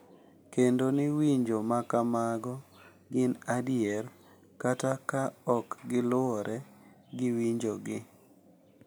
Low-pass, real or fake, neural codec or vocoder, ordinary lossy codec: none; real; none; none